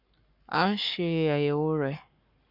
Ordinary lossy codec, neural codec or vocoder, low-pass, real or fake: none; codec, 44.1 kHz, 7.8 kbps, Pupu-Codec; 5.4 kHz; fake